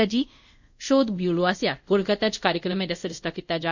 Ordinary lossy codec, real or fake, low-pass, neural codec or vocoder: none; fake; 7.2 kHz; codec, 24 kHz, 0.5 kbps, DualCodec